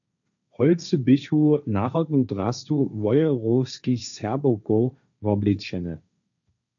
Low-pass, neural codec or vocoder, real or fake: 7.2 kHz; codec, 16 kHz, 1.1 kbps, Voila-Tokenizer; fake